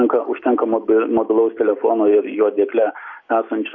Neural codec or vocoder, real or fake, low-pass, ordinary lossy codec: none; real; 7.2 kHz; MP3, 32 kbps